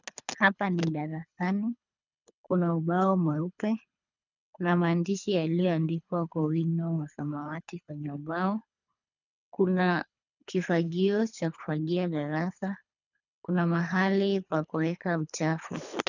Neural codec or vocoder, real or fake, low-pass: codec, 24 kHz, 3 kbps, HILCodec; fake; 7.2 kHz